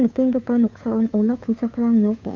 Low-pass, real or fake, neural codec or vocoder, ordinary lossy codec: 7.2 kHz; fake; codec, 16 kHz, 2 kbps, FunCodec, trained on Chinese and English, 25 frames a second; MP3, 64 kbps